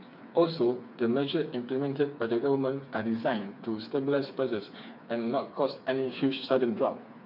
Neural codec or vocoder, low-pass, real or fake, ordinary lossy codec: codec, 16 kHz, 4 kbps, FreqCodec, smaller model; 5.4 kHz; fake; MP3, 48 kbps